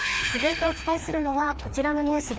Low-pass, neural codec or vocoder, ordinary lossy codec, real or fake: none; codec, 16 kHz, 2 kbps, FreqCodec, larger model; none; fake